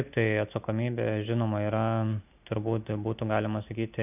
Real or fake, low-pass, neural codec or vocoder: real; 3.6 kHz; none